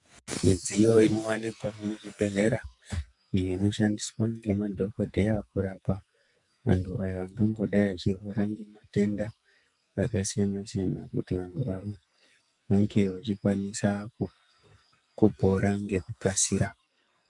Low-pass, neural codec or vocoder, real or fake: 10.8 kHz; codec, 44.1 kHz, 2.6 kbps, SNAC; fake